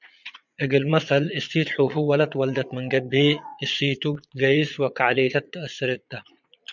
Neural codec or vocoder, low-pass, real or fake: vocoder, 22.05 kHz, 80 mel bands, Vocos; 7.2 kHz; fake